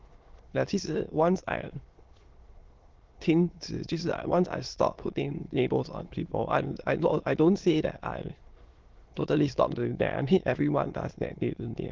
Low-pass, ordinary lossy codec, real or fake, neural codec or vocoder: 7.2 kHz; Opus, 16 kbps; fake; autoencoder, 22.05 kHz, a latent of 192 numbers a frame, VITS, trained on many speakers